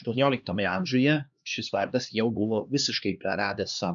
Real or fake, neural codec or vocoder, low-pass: fake; codec, 16 kHz, 2 kbps, X-Codec, HuBERT features, trained on LibriSpeech; 7.2 kHz